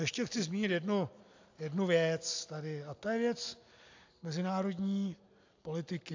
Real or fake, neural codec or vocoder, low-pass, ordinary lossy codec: real; none; 7.2 kHz; AAC, 48 kbps